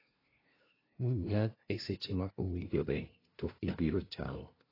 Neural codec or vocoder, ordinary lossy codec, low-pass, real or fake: codec, 16 kHz, 0.5 kbps, FunCodec, trained on LibriTTS, 25 frames a second; AAC, 24 kbps; 5.4 kHz; fake